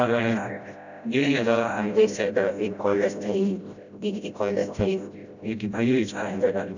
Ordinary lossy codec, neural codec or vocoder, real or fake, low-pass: none; codec, 16 kHz, 0.5 kbps, FreqCodec, smaller model; fake; 7.2 kHz